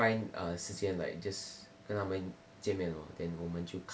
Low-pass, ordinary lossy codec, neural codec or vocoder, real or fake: none; none; none; real